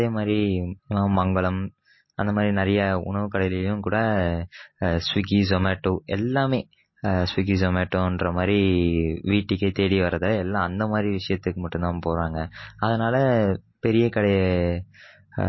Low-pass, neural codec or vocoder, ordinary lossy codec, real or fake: 7.2 kHz; none; MP3, 24 kbps; real